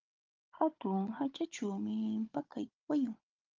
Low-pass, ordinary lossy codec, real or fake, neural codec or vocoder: 7.2 kHz; Opus, 24 kbps; fake; codec, 16 kHz, 16 kbps, FunCodec, trained on Chinese and English, 50 frames a second